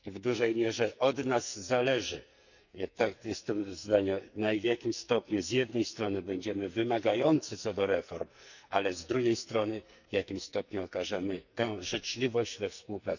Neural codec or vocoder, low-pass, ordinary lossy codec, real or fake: codec, 44.1 kHz, 2.6 kbps, SNAC; 7.2 kHz; none; fake